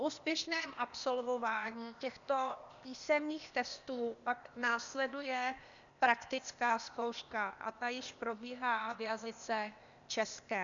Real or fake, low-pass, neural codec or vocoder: fake; 7.2 kHz; codec, 16 kHz, 0.8 kbps, ZipCodec